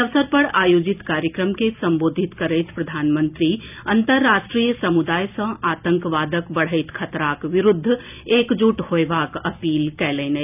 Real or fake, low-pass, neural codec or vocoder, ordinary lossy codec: real; 3.6 kHz; none; none